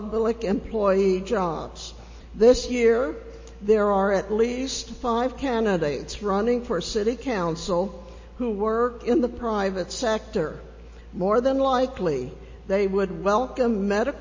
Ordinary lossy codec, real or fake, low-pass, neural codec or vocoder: MP3, 32 kbps; real; 7.2 kHz; none